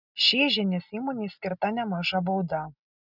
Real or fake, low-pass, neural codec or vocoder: real; 5.4 kHz; none